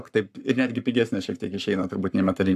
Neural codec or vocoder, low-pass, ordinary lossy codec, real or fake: codec, 44.1 kHz, 7.8 kbps, Pupu-Codec; 14.4 kHz; AAC, 96 kbps; fake